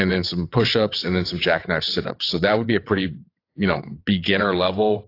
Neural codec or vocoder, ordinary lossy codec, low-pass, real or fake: vocoder, 22.05 kHz, 80 mel bands, WaveNeXt; AAC, 32 kbps; 5.4 kHz; fake